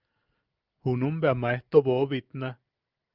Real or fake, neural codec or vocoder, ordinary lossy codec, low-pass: real; none; Opus, 24 kbps; 5.4 kHz